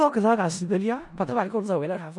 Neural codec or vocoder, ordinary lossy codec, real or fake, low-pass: codec, 16 kHz in and 24 kHz out, 0.4 kbps, LongCat-Audio-Codec, four codebook decoder; none; fake; 10.8 kHz